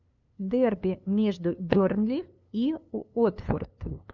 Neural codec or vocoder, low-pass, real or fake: codec, 16 kHz, 2 kbps, FunCodec, trained on LibriTTS, 25 frames a second; 7.2 kHz; fake